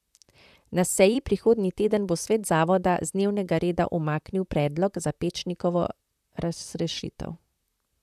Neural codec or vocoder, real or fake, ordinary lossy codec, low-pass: vocoder, 44.1 kHz, 128 mel bands, Pupu-Vocoder; fake; none; 14.4 kHz